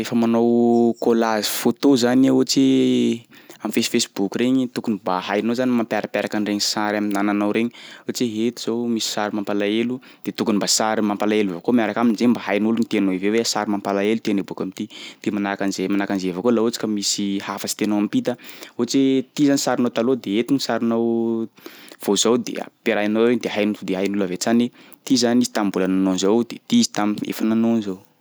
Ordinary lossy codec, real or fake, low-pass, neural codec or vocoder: none; real; none; none